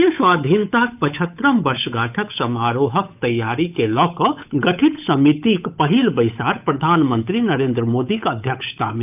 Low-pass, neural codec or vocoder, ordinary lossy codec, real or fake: 3.6 kHz; codec, 16 kHz, 8 kbps, FunCodec, trained on Chinese and English, 25 frames a second; none; fake